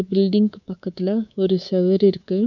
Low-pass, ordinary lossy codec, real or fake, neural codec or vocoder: 7.2 kHz; none; fake; codec, 24 kHz, 3.1 kbps, DualCodec